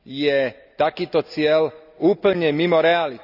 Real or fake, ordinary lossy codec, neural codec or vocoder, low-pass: real; none; none; 5.4 kHz